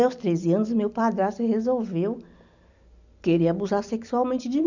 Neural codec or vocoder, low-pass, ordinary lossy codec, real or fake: none; 7.2 kHz; none; real